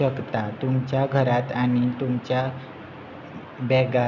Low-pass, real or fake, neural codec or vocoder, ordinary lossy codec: 7.2 kHz; real; none; none